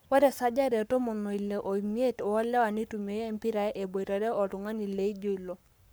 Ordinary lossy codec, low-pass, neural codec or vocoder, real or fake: none; none; codec, 44.1 kHz, 7.8 kbps, Pupu-Codec; fake